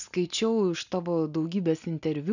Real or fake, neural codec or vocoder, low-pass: real; none; 7.2 kHz